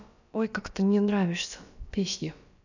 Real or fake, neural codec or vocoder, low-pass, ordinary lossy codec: fake; codec, 16 kHz, about 1 kbps, DyCAST, with the encoder's durations; 7.2 kHz; none